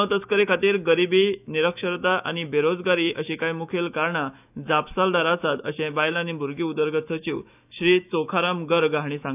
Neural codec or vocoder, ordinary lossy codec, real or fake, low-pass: autoencoder, 48 kHz, 128 numbers a frame, DAC-VAE, trained on Japanese speech; none; fake; 3.6 kHz